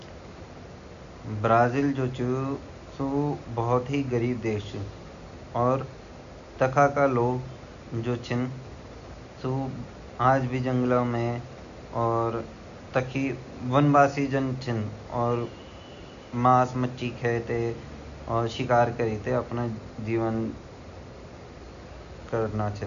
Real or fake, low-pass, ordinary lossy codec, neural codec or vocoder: real; 7.2 kHz; none; none